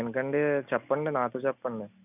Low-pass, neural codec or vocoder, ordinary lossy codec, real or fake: 3.6 kHz; none; none; real